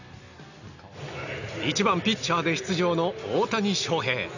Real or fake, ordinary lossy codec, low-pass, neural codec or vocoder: real; none; 7.2 kHz; none